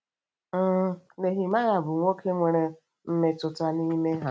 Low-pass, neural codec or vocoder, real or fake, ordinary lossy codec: none; none; real; none